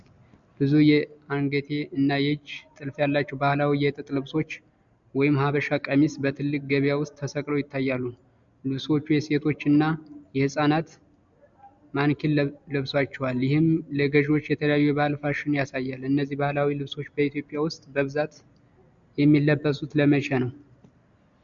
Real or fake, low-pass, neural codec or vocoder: real; 7.2 kHz; none